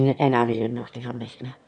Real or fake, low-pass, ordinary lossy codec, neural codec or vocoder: fake; 9.9 kHz; none; autoencoder, 22.05 kHz, a latent of 192 numbers a frame, VITS, trained on one speaker